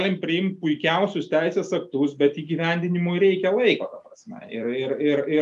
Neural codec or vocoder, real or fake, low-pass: none; real; 10.8 kHz